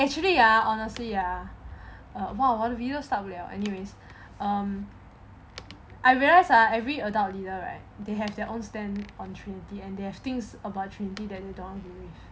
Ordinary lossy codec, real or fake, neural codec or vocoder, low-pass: none; real; none; none